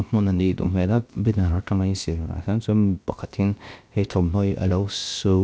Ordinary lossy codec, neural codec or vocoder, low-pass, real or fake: none; codec, 16 kHz, about 1 kbps, DyCAST, with the encoder's durations; none; fake